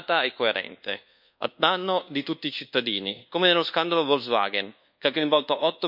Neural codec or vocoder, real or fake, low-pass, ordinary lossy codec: codec, 24 kHz, 1.2 kbps, DualCodec; fake; 5.4 kHz; none